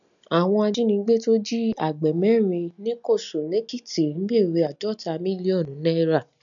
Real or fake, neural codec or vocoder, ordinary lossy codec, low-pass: real; none; none; 7.2 kHz